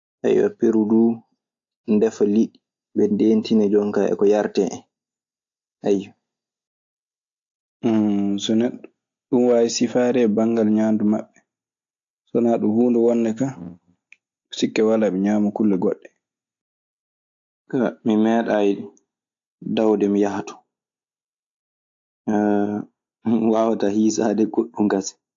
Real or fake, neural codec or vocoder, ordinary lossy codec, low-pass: real; none; AAC, 48 kbps; 7.2 kHz